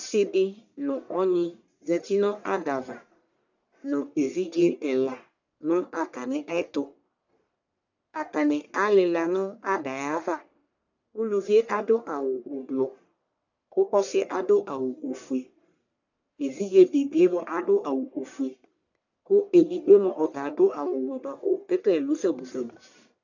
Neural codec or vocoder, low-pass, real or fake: codec, 44.1 kHz, 1.7 kbps, Pupu-Codec; 7.2 kHz; fake